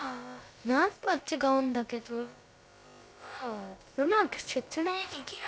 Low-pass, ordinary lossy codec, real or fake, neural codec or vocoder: none; none; fake; codec, 16 kHz, about 1 kbps, DyCAST, with the encoder's durations